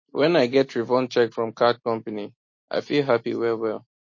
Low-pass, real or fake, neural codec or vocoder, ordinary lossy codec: 7.2 kHz; real; none; MP3, 32 kbps